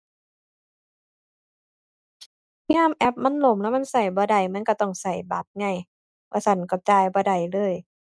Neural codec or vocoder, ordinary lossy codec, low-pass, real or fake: none; none; none; real